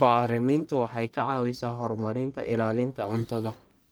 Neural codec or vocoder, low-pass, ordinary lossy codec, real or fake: codec, 44.1 kHz, 1.7 kbps, Pupu-Codec; none; none; fake